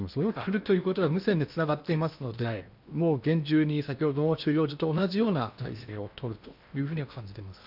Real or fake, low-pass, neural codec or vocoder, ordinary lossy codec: fake; 5.4 kHz; codec, 16 kHz in and 24 kHz out, 0.8 kbps, FocalCodec, streaming, 65536 codes; none